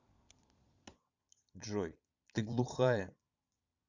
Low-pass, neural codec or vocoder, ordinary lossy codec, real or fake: 7.2 kHz; vocoder, 22.05 kHz, 80 mel bands, Vocos; Opus, 64 kbps; fake